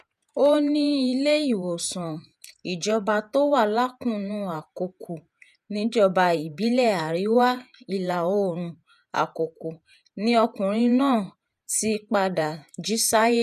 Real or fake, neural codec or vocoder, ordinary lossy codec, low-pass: fake; vocoder, 48 kHz, 128 mel bands, Vocos; none; 14.4 kHz